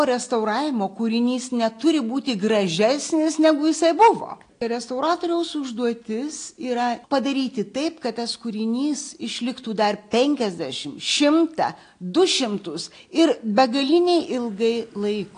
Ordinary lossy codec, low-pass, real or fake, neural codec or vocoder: AAC, 48 kbps; 9.9 kHz; real; none